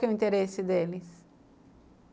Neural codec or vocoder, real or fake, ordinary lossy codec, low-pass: none; real; none; none